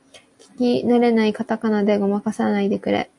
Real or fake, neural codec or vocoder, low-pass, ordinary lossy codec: real; none; 10.8 kHz; AAC, 64 kbps